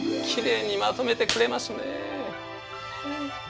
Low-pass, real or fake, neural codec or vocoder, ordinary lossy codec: none; real; none; none